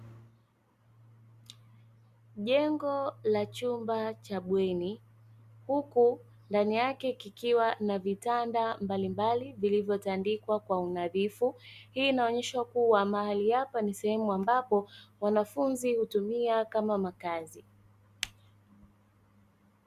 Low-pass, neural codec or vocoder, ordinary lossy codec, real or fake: 14.4 kHz; none; Opus, 64 kbps; real